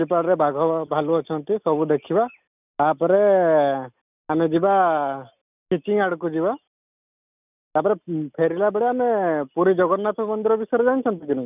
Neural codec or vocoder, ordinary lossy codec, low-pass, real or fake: none; none; 3.6 kHz; real